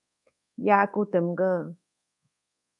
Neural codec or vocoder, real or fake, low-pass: codec, 24 kHz, 1.2 kbps, DualCodec; fake; 10.8 kHz